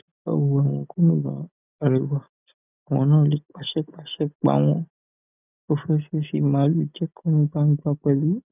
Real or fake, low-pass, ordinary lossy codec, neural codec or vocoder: real; 3.6 kHz; none; none